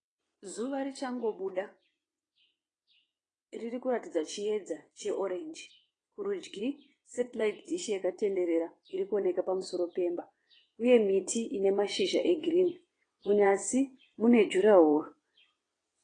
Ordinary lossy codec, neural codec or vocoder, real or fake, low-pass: AAC, 32 kbps; vocoder, 22.05 kHz, 80 mel bands, WaveNeXt; fake; 9.9 kHz